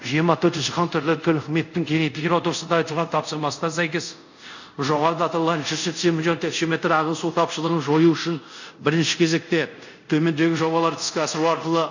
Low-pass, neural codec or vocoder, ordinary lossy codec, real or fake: 7.2 kHz; codec, 24 kHz, 0.5 kbps, DualCodec; none; fake